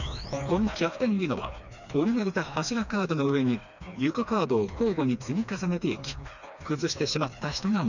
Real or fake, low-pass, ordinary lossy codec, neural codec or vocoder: fake; 7.2 kHz; none; codec, 16 kHz, 2 kbps, FreqCodec, smaller model